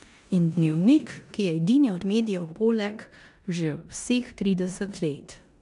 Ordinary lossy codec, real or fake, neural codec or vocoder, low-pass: AAC, 96 kbps; fake; codec, 16 kHz in and 24 kHz out, 0.9 kbps, LongCat-Audio-Codec, four codebook decoder; 10.8 kHz